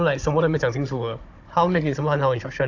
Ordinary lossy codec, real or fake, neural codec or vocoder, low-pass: AAC, 48 kbps; fake; codec, 16 kHz, 16 kbps, FunCodec, trained on Chinese and English, 50 frames a second; 7.2 kHz